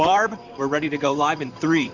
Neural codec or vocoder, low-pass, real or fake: vocoder, 44.1 kHz, 128 mel bands, Pupu-Vocoder; 7.2 kHz; fake